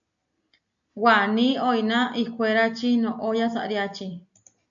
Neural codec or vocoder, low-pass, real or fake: none; 7.2 kHz; real